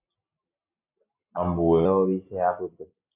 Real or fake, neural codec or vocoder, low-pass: real; none; 3.6 kHz